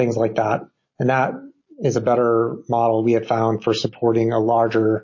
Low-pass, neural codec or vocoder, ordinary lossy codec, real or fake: 7.2 kHz; none; MP3, 32 kbps; real